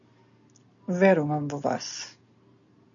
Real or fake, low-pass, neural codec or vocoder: real; 7.2 kHz; none